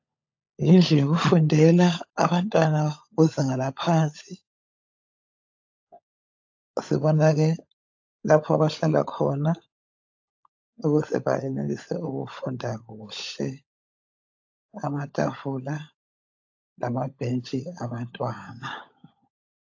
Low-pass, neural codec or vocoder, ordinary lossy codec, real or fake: 7.2 kHz; codec, 16 kHz, 16 kbps, FunCodec, trained on LibriTTS, 50 frames a second; AAC, 48 kbps; fake